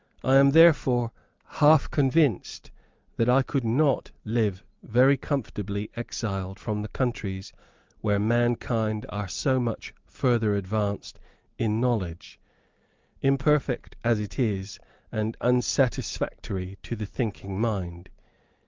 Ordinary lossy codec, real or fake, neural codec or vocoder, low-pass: Opus, 32 kbps; real; none; 7.2 kHz